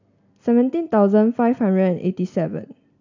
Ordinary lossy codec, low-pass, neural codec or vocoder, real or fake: none; 7.2 kHz; none; real